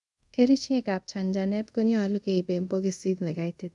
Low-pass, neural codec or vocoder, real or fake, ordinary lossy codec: 10.8 kHz; codec, 24 kHz, 0.5 kbps, DualCodec; fake; none